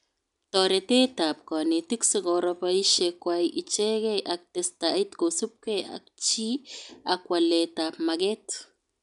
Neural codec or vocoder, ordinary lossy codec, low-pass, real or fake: none; none; 10.8 kHz; real